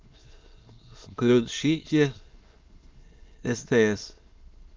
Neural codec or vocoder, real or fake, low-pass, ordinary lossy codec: autoencoder, 22.05 kHz, a latent of 192 numbers a frame, VITS, trained on many speakers; fake; 7.2 kHz; Opus, 24 kbps